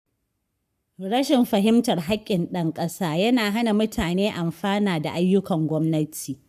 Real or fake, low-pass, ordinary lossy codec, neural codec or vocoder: fake; 14.4 kHz; none; codec, 44.1 kHz, 7.8 kbps, Pupu-Codec